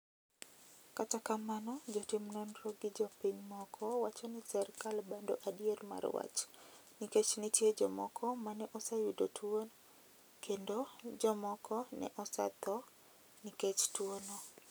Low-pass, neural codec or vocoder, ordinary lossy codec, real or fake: none; none; none; real